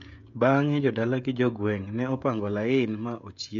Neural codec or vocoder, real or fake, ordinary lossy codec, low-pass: codec, 16 kHz, 16 kbps, FreqCodec, smaller model; fake; MP3, 64 kbps; 7.2 kHz